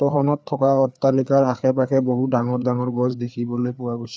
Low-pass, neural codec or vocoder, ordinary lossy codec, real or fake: none; codec, 16 kHz, 4 kbps, FreqCodec, larger model; none; fake